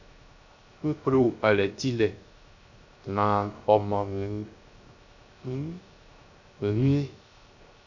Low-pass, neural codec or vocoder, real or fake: 7.2 kHz; codec, 16 kHz, 0.3 kbps, FocalCodec; fake